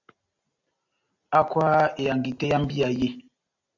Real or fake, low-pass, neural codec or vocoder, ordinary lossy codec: real; 7.2 kHz; none; AAC, 48 kbps